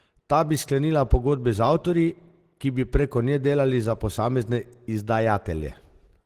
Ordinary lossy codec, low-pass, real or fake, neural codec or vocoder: Opus, 16 kbps; 14.4 kHz; real; none